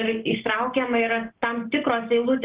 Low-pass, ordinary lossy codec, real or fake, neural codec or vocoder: 3.6 kHz; Opus, 16 kbps; real; none